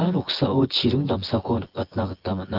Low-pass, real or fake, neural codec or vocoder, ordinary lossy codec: 5.4 kHz; fake; vocoder, 24 kHz, 100 mel bands, Vocos; Opus, 16 kbps